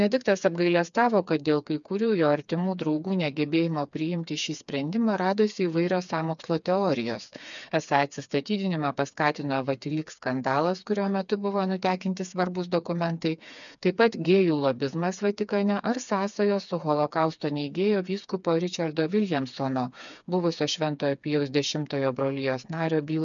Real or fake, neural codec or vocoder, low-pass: fake; codec, 16 kHz, 4 kbps, FreqCodec, smaller model; 7.2 kHz